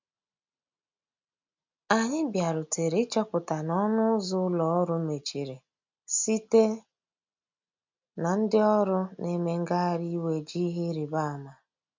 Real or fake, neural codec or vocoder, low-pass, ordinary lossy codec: real; none; 7.2 kHz; MP3, 64 kbps